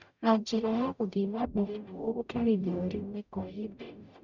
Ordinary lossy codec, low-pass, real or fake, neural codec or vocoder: none; 7.2 kHz; fake; codec, 44.1 kHz, 0.9 kbps, DAC